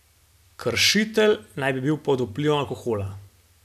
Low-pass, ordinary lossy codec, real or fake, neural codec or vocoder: 14.4 kHz; none; real; none